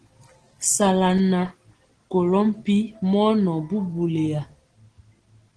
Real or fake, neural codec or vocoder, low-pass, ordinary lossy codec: real; none; 9.9 kHz; Opus, 16 kbps